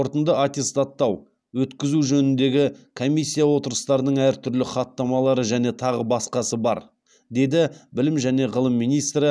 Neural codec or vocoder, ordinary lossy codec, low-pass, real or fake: none; none; none; real